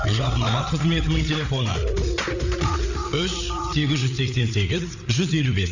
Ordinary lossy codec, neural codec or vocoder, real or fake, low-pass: none; codec, 16 kHz, 8 kbps, FreqCodec, larger model; fake; 7.2 kHz